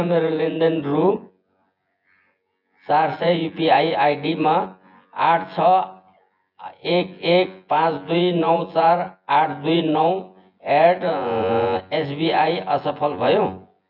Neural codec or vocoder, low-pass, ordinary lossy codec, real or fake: vocoder, 24 kHz, 100 mel bands, Vocos; 5.4 kHz; none; fake